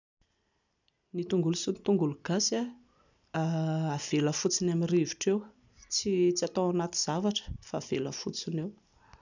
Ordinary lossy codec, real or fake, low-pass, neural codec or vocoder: none; real; 7.2 kHz; none